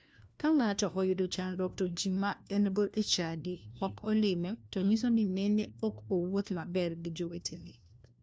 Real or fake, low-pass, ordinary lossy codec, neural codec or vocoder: fake; none; none; codec, 16 kHz, 1 kbps, FunCodec, trained on LibriTTS, 50 frames a second